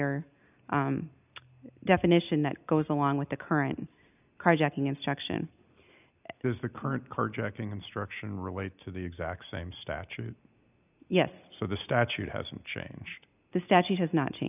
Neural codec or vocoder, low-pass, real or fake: none; 3.6 kHz; real